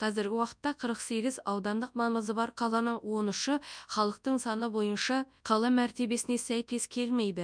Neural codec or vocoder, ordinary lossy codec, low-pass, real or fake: codec, 24 kHz, 0.9 kbps, WavTokenizer, large speech release; none; 9.9 kHz; fake